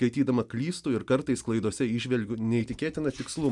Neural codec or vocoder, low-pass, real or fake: none; 10.8 kHz; real